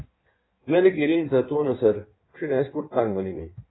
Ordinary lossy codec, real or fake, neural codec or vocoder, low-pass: AAC, 16 kbps; fake; codec, 16 kHz in and 24 kHz out, 1.1 kbps, FireRedTTS-2 codec; 7.2 kHz